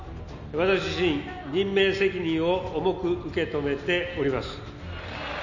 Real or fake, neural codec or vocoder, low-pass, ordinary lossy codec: real; none; 7.2 kHz; none